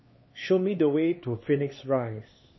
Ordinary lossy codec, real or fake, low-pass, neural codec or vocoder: MP3, 24 kbps; fake; 7.2 kHz; codec, 16 kHz, 2 kbps, X-Codec, HuBERT features, trained on LibriSpeech